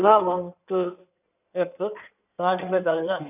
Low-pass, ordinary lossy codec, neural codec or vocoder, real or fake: 3.6 kHz; none; codec, 16 kHz in and 24 kHz out, 2.2 kbps, FireRedTTS-2 codec; fake